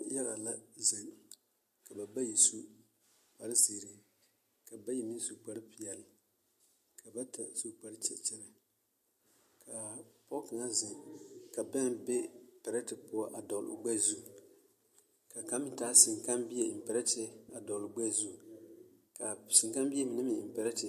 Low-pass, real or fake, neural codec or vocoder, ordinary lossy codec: 14.4 kHz; real; none; MP3, 64 kbps